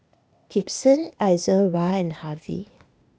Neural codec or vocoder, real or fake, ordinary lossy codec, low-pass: codec, 16 kHz, 0.8 kbps, ZipCodec; fake; none; none